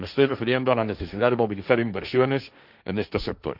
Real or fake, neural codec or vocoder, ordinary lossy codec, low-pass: fake; codec, 16 kHz, 1.1 kbps, Voila-Tokenizer; none; 5.4 kHz